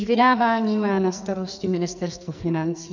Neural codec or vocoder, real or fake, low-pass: codec, 44.1 kHz, 2.6 kbps, SNAC; fake; 7.2 kHz